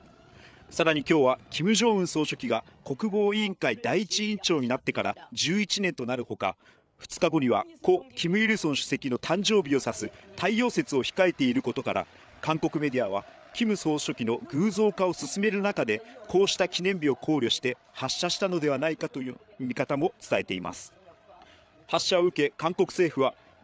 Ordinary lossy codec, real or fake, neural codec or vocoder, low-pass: none; fake; codec, 16 kHz, 8 kbps, FreqCodec, larger model; none